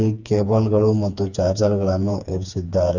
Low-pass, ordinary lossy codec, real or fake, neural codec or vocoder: 7.2 kHz; none; fake; codec, 16 kHz, 4 kbps, FreqCodec, smaller model